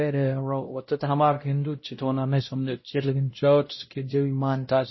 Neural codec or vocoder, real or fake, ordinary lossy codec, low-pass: codec, 16 kHz, 0.5 kbps, X-Codec, WavLM features, trained on Multilingual LibriSpeech; fake; MP3, 24 kbps; 7.2 kHz